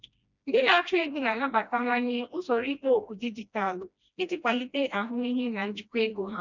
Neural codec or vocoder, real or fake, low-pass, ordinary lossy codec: codec, 16 kHz, 1 kbps, FreqCodec, smaller model; fake; 7.2 kHz; none